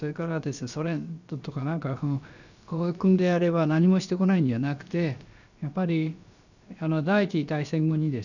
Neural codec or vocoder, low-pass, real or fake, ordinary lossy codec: codec, 16 kHz, about 1 kbps, DyCAST, with the encoder's durations; 7.2 kHz; fake; none